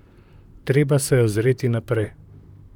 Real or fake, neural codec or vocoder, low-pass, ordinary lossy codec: fake; vocoder, 44.1 kHz, 128 mel bands, Pupu-Vocoder; 19.8 kHz; none